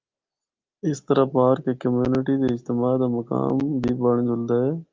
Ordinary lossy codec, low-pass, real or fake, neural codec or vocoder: Opus, 32 kbps; 7.2 kHz; real; none